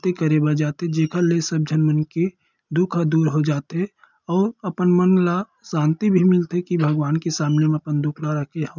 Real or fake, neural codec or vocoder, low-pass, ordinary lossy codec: real; none; 7.2 kHz; none